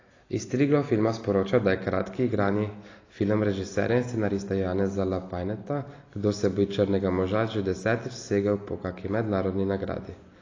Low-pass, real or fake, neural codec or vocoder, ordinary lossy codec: 7.2 kHz; real; none; AAC, 32 kbps